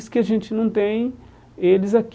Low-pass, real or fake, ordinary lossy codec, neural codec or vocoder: none; real; none; none